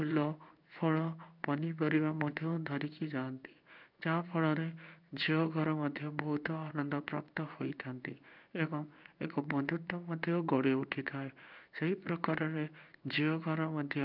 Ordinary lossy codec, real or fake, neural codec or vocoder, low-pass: none; fake; codec, 16 kHz in and 24 kHz out, 1 kbps, XY-Tokenizer; 5.4 kHz